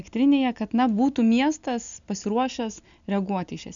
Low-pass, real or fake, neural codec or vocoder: 7.2 kHz; real; none